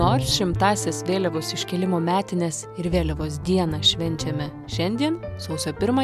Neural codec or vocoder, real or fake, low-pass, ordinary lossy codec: none; real; 14.4 kHz; MP3, 96 kbps